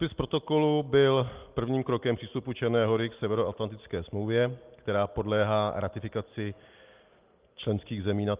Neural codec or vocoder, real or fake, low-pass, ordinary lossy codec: none; real; 3.6 kHz; Opus, 32 kbps